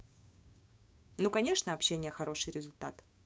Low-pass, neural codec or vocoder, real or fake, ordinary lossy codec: none; codec, 16 kHz, 6 kbps, DAC; fake; none